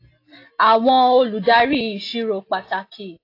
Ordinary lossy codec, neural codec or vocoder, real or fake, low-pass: AAC, 32 kbps; none; real; 5.4 kHz